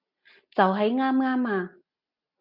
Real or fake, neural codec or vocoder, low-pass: real; none; 5.4 kHz